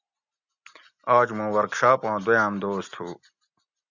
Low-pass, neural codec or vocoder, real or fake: 7.2 kHz; none; real